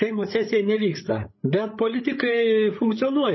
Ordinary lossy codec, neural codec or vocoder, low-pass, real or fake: MP3, 24 kbps; codec, 16 kHz, 8 kbps, FreqCodec, larger model; 7.2 kHz; fake